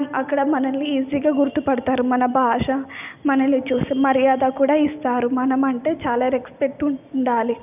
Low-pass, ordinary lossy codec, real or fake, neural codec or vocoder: 3.6 kHz; none; real; none